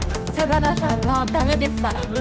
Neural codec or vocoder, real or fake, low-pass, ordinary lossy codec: codec, 16 kHz, 1 kbps, X-Codec, HuBERT features, trained on general audio; fake; none; none